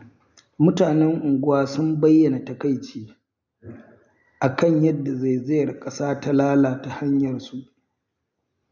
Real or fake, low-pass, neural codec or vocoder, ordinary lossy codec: real; 7.2 kHz; none; none